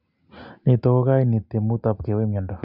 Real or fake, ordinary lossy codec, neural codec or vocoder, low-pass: real; none; none; 5.4 kHz